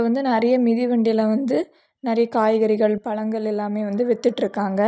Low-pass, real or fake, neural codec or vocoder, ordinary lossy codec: none; real; none; none